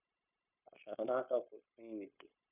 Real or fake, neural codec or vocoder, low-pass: fake; codec, 16 kHz, 0.9 kbps, LongCat-Audio-Codec; 3.6 kHz